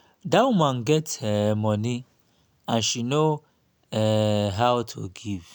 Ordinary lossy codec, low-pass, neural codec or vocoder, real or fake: none; none; none; real